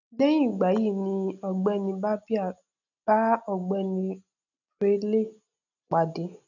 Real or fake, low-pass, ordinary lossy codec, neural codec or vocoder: real; 7.2 kHz; none; none